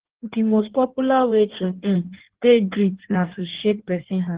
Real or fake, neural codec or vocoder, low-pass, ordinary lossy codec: fake; codec, 16 kHz in and 24 kHz out, 1.1 kbps, FireRedTTS-2 codec; 3.6 kHz; Opus, 16 kbps